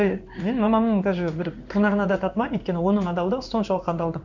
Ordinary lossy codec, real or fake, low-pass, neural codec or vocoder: none; fake; 7.2 kHz; codec, 16 kHz in and 24 kHz out, 1 kbps, XY-Tokenizer